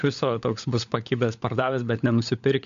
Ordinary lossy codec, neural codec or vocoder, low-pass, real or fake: MP3, 64 kbps; codec, 16 kHz, 16 kbps, FunCodec, trained on LibriTTS, 50 frames a second; 7.2 kHz; fake